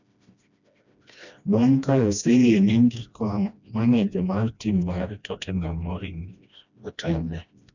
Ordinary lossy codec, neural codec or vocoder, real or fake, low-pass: none; codec, 16 kHz, 1 kbps, FreqCodec, smaller model; fake; 7.2 kHz